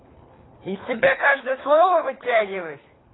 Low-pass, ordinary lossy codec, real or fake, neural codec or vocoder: 7.2 kHz; AAC, 16 kbps; fake; codec, 24 kHz, 3 kbps, HILCodec